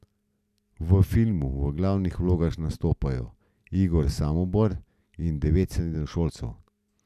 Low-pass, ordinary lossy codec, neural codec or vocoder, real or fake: 14.4 kHz; none; none; real